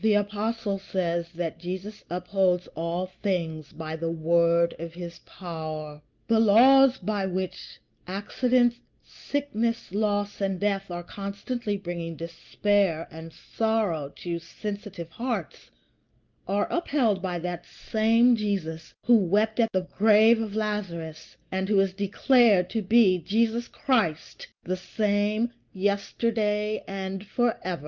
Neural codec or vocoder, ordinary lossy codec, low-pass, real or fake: none; Opus, 32 kbps; 7.2 kHz; real